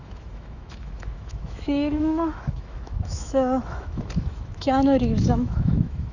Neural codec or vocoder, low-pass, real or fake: autoencoder, 48 kHz, 128 numbers a frame, DAC-VAE, trained on Japanese speech; 7.2 kHz; fake